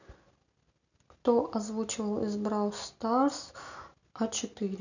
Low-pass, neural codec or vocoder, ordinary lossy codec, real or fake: 7.2 kHz; none; none; real